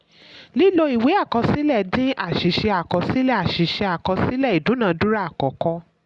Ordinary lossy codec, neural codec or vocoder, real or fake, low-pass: Opus, 64 kbps; none; real; 10.8 kHz